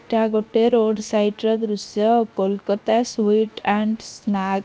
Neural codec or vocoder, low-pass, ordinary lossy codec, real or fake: codec, 16 kHz, 0.7 kbps, FocalCodec; none; none; fake